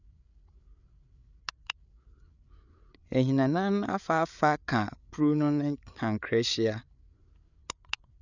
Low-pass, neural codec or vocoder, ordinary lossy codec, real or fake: 7.2 kHz; codec, 16 kHz, 8 kbps, FreqCodec, larger model; none; fake